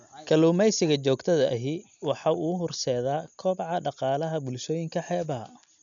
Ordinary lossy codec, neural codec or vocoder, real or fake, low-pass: MP3, 96 kbps; none; real; 7.2 kHz